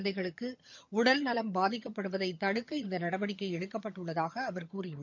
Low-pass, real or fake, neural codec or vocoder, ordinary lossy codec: 7.2 kHz; fake; vocoder, 22.05 kHz, 80 mel bands, HiFi-GAN; MP3, 64 kbps